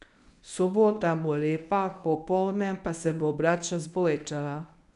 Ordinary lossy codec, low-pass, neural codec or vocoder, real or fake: none; 10.8 kHz; codec, 24 kHz, 0.9 kbps, WavTokenizer, medium speech release version 1; fake